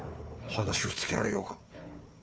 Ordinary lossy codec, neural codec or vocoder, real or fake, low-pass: none; codec, 16 kHz, 4 kbps, FunCodec, trained on Chinese and English, 50 frames a second; fake; none